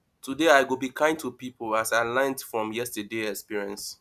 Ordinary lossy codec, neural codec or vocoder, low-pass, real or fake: none; none; 14.4 kHz; real